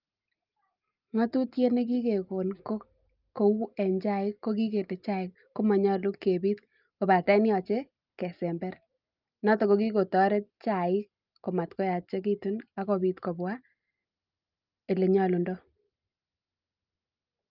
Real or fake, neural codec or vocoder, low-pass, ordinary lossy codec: real; none; 5.4 kHz; Opus, 24 kbps